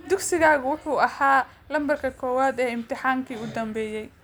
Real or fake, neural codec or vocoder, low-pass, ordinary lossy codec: real; none; none; none